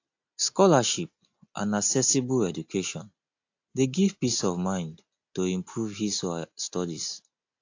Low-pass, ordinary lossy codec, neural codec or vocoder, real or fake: 7.2 kHz; AAC, 48 kbps; none; real